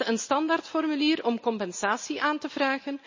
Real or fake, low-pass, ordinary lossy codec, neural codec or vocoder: real; 7.2 kHz; MP3, 32 kbps; none